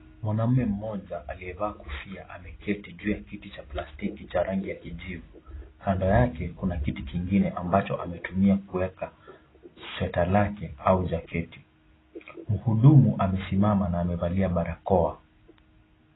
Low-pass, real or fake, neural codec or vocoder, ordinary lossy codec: 7.2 kHz; real; none; AAC, 16 kbps